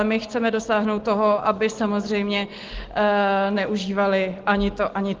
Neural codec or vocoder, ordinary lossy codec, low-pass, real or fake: none; Opus, 16 kbps; 7.2 kHz; real